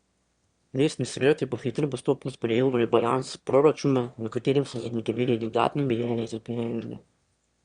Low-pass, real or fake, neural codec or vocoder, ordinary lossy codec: 9.9 kHz; fake; autoencoder, 22.05 kHz, a latent of 192 numbers a frame, VITS, trained on one speaker; Opus, 64 kbps